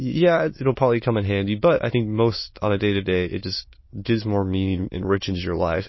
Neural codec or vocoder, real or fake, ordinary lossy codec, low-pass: autoencoder, 22.05 kHz, a latent of 192 numbers a frame, VITS, trained on many speakers; fake; MP3, 24 kbps; 7.2 kHz